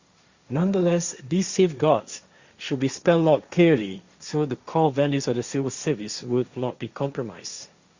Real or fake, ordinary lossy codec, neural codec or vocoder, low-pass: fake; Opus, 64 kbps; codec, 16 kHz, 1.1 kbps, Voila-Tokenizer; 7.2 kHz